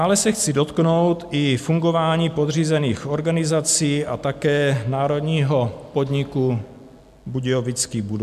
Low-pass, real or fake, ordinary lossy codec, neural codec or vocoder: 14.4 kHz; real; MP3, 96 kbps; none